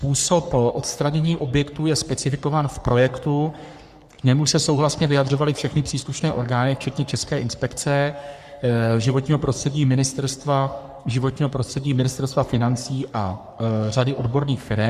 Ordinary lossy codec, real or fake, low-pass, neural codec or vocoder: Opus, 64 kbps; fake; 14.4 kHz; codec, 44.1 kHz, 3.4 kbps, Pupu-Codec